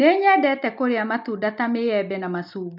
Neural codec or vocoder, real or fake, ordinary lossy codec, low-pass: none; real; none; 5.4 kHz